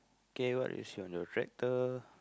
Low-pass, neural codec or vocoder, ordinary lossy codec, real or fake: none; none; none; real